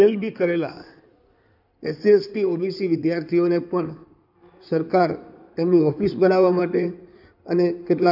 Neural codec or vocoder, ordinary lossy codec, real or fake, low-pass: codec, 16 kHz in and 24 kHz out, 2.2 kbps, FireRedTTS-2 codec; none; fake; 5.4 kHz